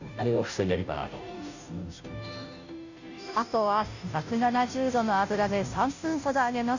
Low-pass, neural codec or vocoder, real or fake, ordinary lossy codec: 7.2 kHz; codec, 16 kHz, 0.5 kbps, FunCodec, trained on Chinese and English, 25 frames a second; fake; none